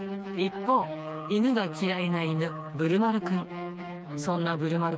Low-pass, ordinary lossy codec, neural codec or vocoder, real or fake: none; none; codec, 16 kHz, 2 kbps, FreqCodec, smaller model; fake